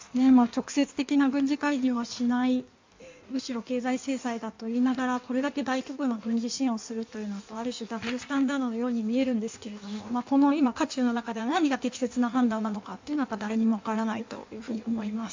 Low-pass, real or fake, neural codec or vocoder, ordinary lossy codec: 7.2 kHz; fake; codec, 16 kHz in and 24 kHz out, 1.1 kbps, FireRedTTS-2 codec; MP3, 64 kbps